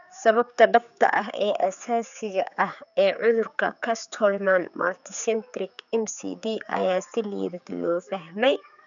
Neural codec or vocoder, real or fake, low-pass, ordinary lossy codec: codec, 16 kHz, 4 kbps, X-Codec, HuBERT features, trained on general audio; fake; 7.2 kHz; none